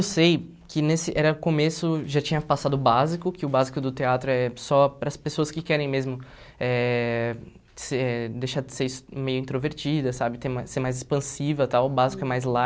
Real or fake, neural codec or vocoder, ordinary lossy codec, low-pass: real; none; none; none